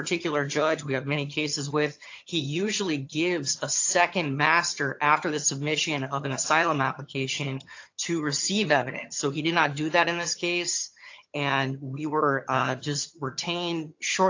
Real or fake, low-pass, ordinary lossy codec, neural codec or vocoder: fake; 7.2 kHz; AAC, 48 kbps; vocoder, 22.05 kHz, 80 mel bands, HiFi-GAN